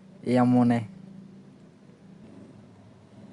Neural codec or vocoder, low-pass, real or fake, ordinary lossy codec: none; 10.8 kHz; real; Opus, 64 kbps